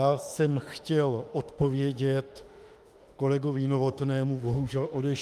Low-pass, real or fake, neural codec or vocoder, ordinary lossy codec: 14.4 kHz; fake; autoencoder, 48 kHz, 32 numbers a frame, DAC-VAE, trained on Japanese speech; Opus, 32 kbps